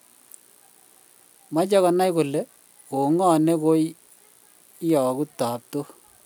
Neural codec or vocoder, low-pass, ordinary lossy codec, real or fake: none; none; none; real